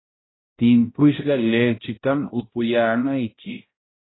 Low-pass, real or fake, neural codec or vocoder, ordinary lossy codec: 7.2 kHz; fake; codec, 16 kHz, 0.5 kbps, X-Codec, HuBERT features, trained on balanced general audio; AAC, 16 kbps